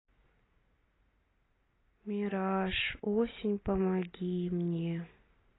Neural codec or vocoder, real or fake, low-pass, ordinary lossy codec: none; real; 7.2 kHz; AAC, 16 kbps